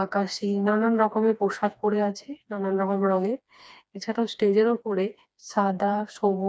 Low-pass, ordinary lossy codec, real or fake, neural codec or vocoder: none; none; fake; codec, 16 kHz, 2 kbps, FreqCodec, smaller model